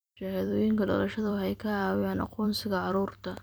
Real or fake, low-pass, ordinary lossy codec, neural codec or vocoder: fake; none; none; vocoder, 44.1 kHz, 128 mel bands every 256 samples, BigVGAN v2